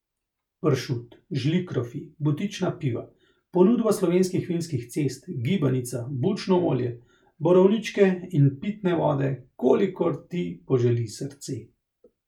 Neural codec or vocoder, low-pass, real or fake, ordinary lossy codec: none; 19.8 kHz; real; none